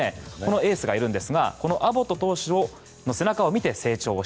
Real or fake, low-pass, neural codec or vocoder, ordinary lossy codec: real; none; none; none